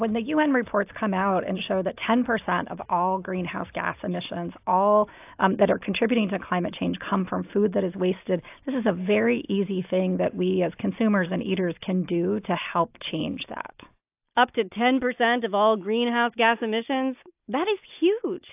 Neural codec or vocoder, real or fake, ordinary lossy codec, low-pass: none; real; AAC, 32 kbps; 3.6 kHz